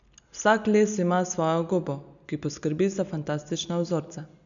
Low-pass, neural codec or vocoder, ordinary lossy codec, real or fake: 7.2 kHz; none; none; real